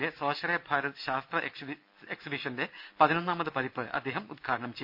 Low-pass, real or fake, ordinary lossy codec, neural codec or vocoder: 5.4 kHz; real; none; none